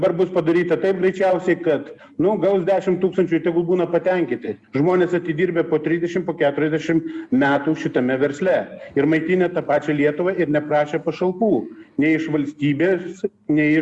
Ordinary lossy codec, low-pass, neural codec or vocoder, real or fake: Opus, 64 kbps; 10.8 kHz; none; real